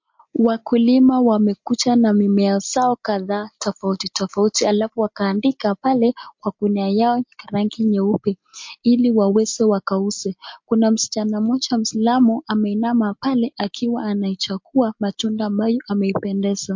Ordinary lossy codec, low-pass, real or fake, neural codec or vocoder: MP3, 48 kbps; 7.2 kHz; real; none